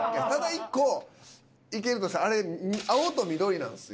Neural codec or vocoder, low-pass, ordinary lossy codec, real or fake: none; none; none; real